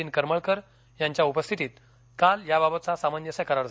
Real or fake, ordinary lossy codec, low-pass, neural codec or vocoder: real; none; none; none